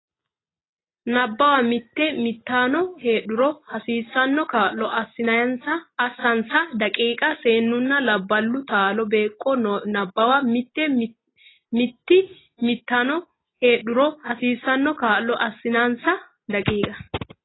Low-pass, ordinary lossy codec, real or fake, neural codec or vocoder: 7.2 kHz; AAC, 16 kbps; real; none